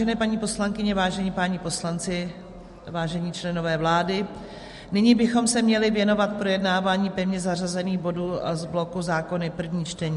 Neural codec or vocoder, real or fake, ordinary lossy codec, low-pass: none; real; MP3, 48 kbps; 14.4 kHz